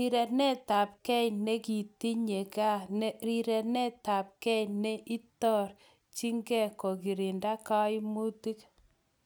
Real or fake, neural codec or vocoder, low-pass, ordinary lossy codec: real; none; none; none